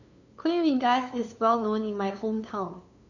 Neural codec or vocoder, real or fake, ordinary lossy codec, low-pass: codec, 16 kHz, 2 kbps, FunCodec, trained on LibriTTS, 25 frames a second; fake; none; 7.2 kHz